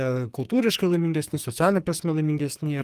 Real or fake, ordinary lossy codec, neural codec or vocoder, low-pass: fake; Opus, 32 kbps; codec, 44.1 kHz, 2.6 kbps, SNAC; 14.4 kHz